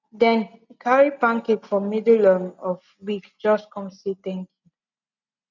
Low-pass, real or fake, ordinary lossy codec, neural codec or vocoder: 7.2 kHz; real; none; none